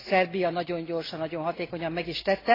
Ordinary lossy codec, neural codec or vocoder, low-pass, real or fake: AAC, 24 kbps; none; 5.4 kHz; real